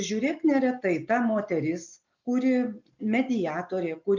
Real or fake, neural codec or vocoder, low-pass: real; none; 7.2 kHz